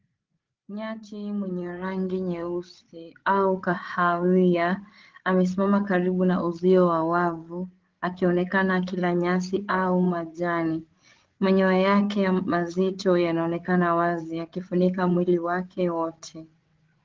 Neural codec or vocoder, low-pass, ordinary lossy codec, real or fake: codec, 16 kHz, 8 kbps, FreqCodec, larger model; 7.2 kHz; Opus, 16 kbps; fake